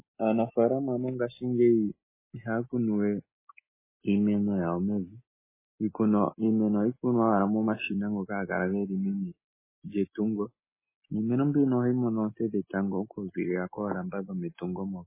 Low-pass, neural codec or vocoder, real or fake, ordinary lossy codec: 3.6 kHz; none; real; MP3, 16 kbps